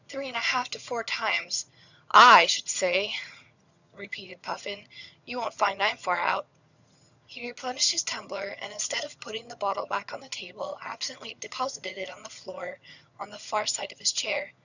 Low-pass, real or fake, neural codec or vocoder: 7.2 kHz; fake; vocoder, 22.05 kHz, 80 mel bands, HiFi-GAN